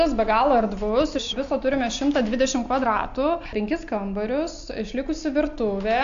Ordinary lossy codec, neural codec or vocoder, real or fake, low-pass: AAC, 64 kbps; none; real; 7.2 kHz